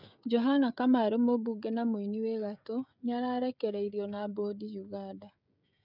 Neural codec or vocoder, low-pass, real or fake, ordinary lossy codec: codec, 16 kHz, 16 kbps, FreqCodec, smaller model; 5.4 kHz; fake; none